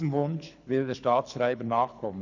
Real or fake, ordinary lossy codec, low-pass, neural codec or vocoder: fake; none; 7.2 kHz; codec, 24 kHz, 6 kbps, HILCodec